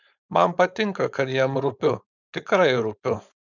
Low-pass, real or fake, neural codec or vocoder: 7.2 kHz; fake; codec, 16 kHz, 4.8 kbps, FACodec